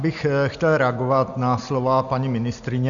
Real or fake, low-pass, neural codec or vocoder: real; 7.2 kHz; none